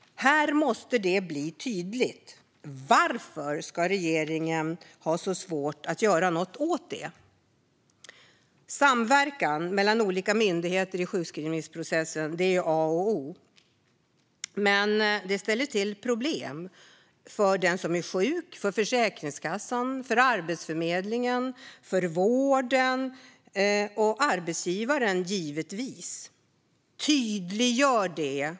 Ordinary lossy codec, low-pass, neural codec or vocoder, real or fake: none; none; none; real